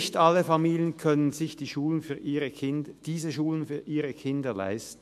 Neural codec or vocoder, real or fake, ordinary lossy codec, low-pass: autoencoder, 48 kHz, 128 numbers a frame, DAC-VAE, trained on Japanese speech; fake; MP3, 64 kbps; 14.4 kHz